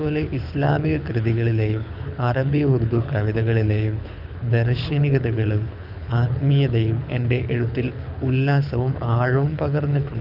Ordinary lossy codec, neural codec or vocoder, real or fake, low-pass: none; codec, 24 kHz, 6 kbps, HILCodec; fake; 5.4 kHz